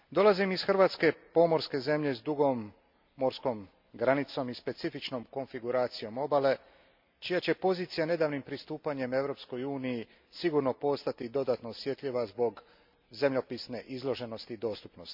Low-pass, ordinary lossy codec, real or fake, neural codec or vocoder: 5.4 kHz; MP3, 48 kbps; real; none